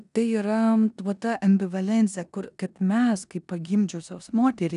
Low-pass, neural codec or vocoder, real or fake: 10.8 kHz; codec, 16 kHz in and 24 kHz out, 0.9 kbps, LongCat-Audio-Codec, four codebook decoder; fake